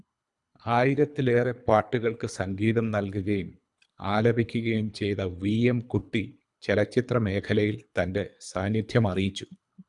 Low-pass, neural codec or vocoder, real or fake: 10.8 kHz; codec, 24 kHz, 3 kbps, HILCodec; fake